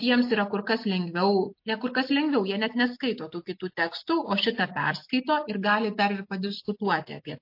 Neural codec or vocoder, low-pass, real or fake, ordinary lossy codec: none; 5.4 kHz; real; MP3, 32 kbps